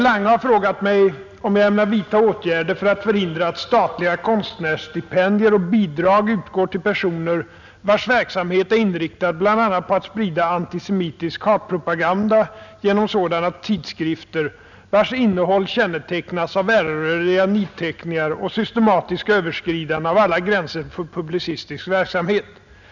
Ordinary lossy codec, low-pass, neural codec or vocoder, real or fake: none; 7.2 kHz; none; real